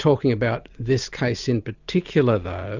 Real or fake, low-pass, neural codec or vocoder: real; 7.2 kHz; none